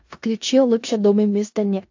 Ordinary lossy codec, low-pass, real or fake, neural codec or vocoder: AAC, 48 kbps; 7.2 kHz; fake; codec, 16 kHz in and 24 kHz out, 0.4 kbps, LongCat-Audio-Codec, four codebook decoder